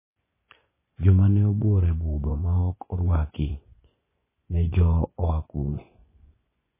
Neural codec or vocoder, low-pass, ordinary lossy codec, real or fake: vocoder, 24 kHz, 100 mel bands, Vocos; 3.6 kHz; MP3, 16 kbps; fake